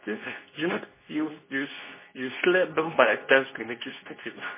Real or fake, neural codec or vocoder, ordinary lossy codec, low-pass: fake; codec, 24 kHz, 0.9 kbps, WavTokenizer, medium speech release version 2; MP3, 16 kbps; 3.6 kHz